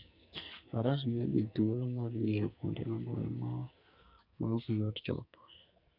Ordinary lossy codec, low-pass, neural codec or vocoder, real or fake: none; 5.4 kHz; codec, 44.1 kHz, 2.6 kbps, SNAC; fake